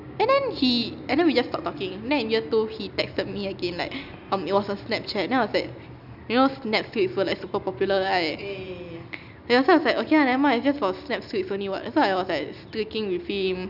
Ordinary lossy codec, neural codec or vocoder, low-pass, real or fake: none; none; 5.4 kHz; real